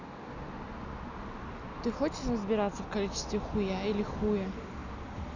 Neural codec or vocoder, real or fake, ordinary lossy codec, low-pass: none; real; none; 7.2 kHz